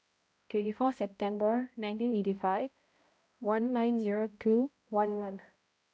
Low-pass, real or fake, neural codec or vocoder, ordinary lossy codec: none; fake; codec, 16 kHz, 0.5 kbps, X-Codec, HuBERT features, trained on balanced general audio; none